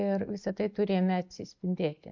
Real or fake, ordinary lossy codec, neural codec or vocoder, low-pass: real; MP3, 48 kbps; none; 7.2 kHz